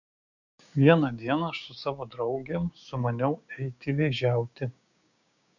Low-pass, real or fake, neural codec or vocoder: 7.2 kHz; fake; codec, 16 kHz, 6 kbps, DAC